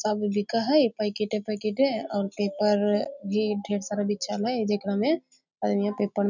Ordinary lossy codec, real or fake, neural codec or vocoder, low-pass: none; real; none; 7.2 kHz